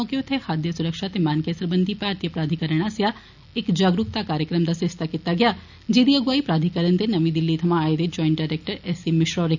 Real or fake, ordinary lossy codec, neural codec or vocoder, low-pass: real; none; none; 7.2 kHz